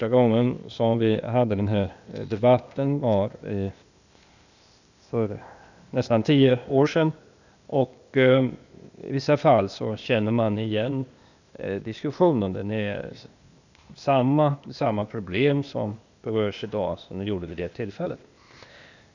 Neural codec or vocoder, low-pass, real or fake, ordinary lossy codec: codec, 16 kHz, 0.8 kbps, ZipCodec; 7.2 kHz; fake; none